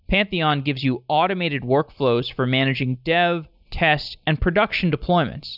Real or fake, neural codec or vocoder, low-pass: real; none; 5.4 kHz